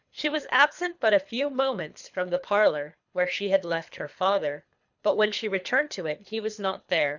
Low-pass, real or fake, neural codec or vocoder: 7.2 kHz; fake; codec, 24 kHz, 3 kbps, HILCodec